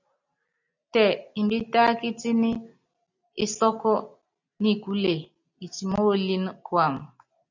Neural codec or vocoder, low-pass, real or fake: none; 7.2 kHz; real